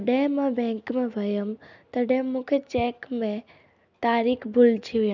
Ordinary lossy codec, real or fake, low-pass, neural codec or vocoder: none; real; 7.2 kHz; none